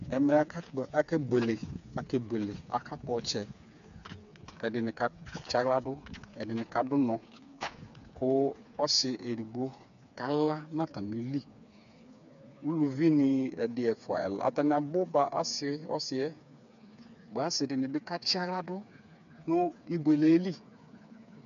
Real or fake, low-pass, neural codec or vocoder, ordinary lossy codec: fake; 7.2 kHz; codec, 16 kHz, 4 kbps, FreqCodec, smaller model; AAC, 96 kbps